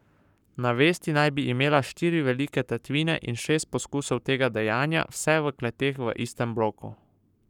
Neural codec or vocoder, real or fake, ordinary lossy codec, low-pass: codec, 44.1 kHz, 7.8 kbps, Pupu-Codec; fake; none; 19.8 kHz